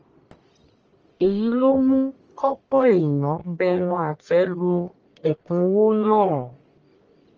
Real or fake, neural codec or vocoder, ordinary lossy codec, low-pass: fake; codec, 44.1 kHz, 1.7 kbps, Pupu-Codec; Opus, 24 kbps; 7.2 kHz